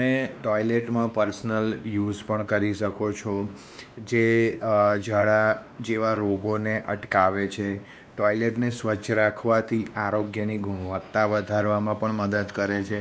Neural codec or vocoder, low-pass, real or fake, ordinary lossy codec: codec, 16 kHz, 2 kbps, X-Codec, WavLM features, trained on Multilingual LibriSpeech; none; fake; none